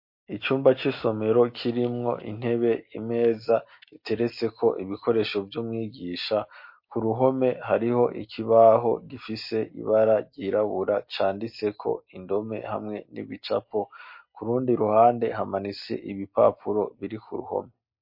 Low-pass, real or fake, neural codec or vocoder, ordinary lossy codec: 5.4 kHz; real; none; MP3, 32 kbps